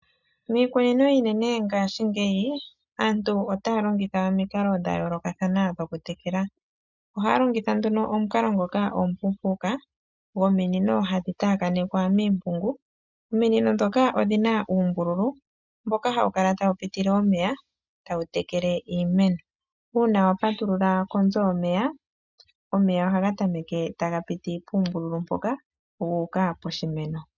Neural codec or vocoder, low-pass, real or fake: none; 7.2 kHz; real